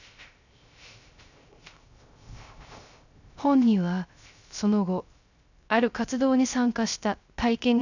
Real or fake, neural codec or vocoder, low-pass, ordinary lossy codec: fake; codec, 16 kHz, 0.3 kbps, FocalCodec; 7.2 kHz; none